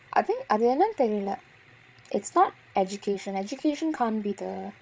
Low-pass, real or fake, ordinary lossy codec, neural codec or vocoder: none; fake; none; codec, 16 kHz, 16 kbps, FreqCodec, larger model